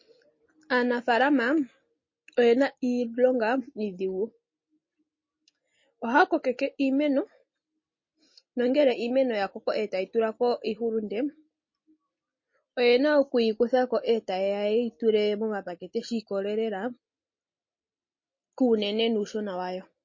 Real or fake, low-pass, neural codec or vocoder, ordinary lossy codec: real; 7.2 kHz; none; MP3, 32 kbps